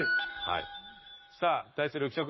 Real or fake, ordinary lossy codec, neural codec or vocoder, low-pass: fake; MP3, 24 kbps; codec, 44.1 kHz, 7.8 kbps, Pupu-Codec; 7.2 kHz